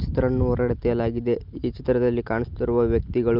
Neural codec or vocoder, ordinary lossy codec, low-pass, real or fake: none; Opus, 32 kbps; 5.4 kHz; real